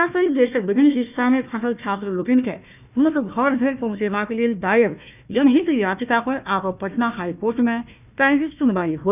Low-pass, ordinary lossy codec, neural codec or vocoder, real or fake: 3.6 kHz; none; codec, 16 kHz, 1 kbps, FunCodec, trained on Chinese and English, 50 frames a second; fake